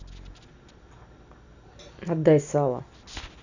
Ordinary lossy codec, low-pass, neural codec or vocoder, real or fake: none; 7.2 kHz; none; real